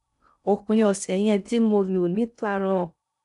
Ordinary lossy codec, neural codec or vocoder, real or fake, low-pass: none; codec, 16 kHz in and 24 kHz out, 0.6 kbps, FocalCodec, streaming, 2048 codes; fake; 10.8 kHz